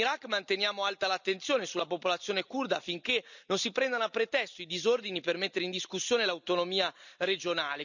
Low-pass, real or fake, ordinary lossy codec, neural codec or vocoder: 7.2 kHz; real; none; none